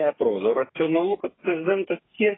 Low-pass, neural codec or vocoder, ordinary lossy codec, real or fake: 7.2 kHz; codec, 32 kHz, 1.9 kbps, SNAC; AAC, 16 kbps; fake